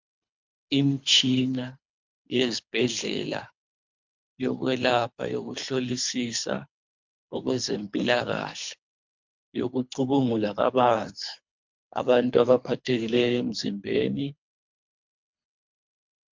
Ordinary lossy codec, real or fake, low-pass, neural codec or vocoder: MP3, 64 kbps; fake; 7.2 kHz; codec, 24 kHz, 3 kbps, HILCodec